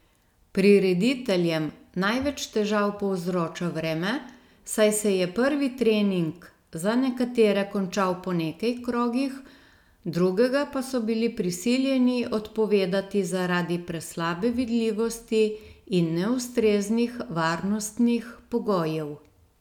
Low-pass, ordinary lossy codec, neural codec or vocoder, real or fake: 19.8 kHz; none; none; real